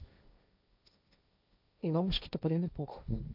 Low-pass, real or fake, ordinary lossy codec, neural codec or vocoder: 5.4 kHz; fake; none; codec, 16 kHz, 1.1 kbps, Voila-Tokenizer